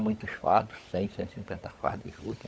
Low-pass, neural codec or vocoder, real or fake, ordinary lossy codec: none; codec, 16 kHz, 16 kbps, FunCodec, trained on LibriTTS, 50 frames a second; fake; none